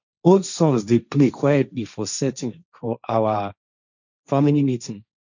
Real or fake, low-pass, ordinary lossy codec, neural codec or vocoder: fake; 7.2 kHz; none; codec, 16 kHz, 1.1 kbps, Voila-Tokenizer